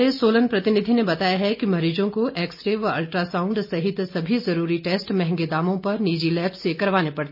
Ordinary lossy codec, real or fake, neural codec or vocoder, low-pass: none; real; none; 5.4 kHz